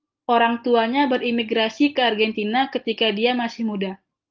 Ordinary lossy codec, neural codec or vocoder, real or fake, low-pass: Opus, 24 kbps; none; real; 7.2 kHz